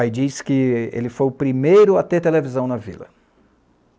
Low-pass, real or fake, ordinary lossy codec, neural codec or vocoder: none; real; none; none